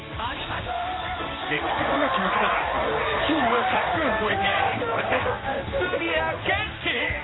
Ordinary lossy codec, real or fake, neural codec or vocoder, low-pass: AAC, 16 kbps; fake; codec, 16 kHz in and 24 kHz out, 1 kbps, XY-Tokenizer; 7.2 kHz